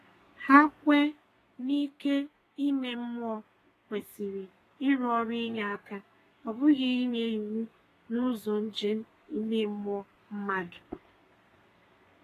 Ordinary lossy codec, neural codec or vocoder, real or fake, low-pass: AAC, 48 kbps; codec, 32 kHz, 1.9 kbps, SNAC; fake; 14.4 kHz